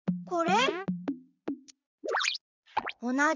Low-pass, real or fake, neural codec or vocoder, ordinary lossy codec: 7.2 kHz; fake; vocoder, 22.05 kHz, 80 mel bands, Vocos; none